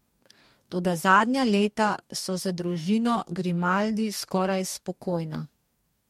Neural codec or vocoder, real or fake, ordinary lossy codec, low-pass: codec, 44.1 kHz, 2.6 kbps, DAC; fake; MP3, 64 kbps; 19.8 kHz